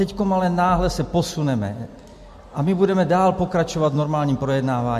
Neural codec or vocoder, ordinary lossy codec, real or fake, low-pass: none; MP3, 64 kbps; real; 14.4 kHz